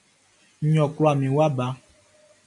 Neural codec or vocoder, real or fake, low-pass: none; real; 10.8 kHz